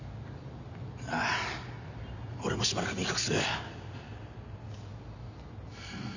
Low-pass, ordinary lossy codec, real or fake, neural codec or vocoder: 7.2 kHz; none; real; none